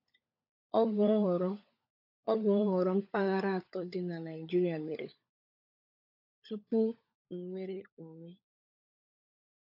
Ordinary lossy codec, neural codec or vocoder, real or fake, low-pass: AAC, 32 kbps; codec, 16 kHz, 16 kbps, FunCodec, trained on LibriTTS, 50 frames a second; fake; 5.4 kHz